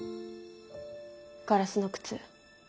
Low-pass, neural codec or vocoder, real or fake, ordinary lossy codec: none; none; real; none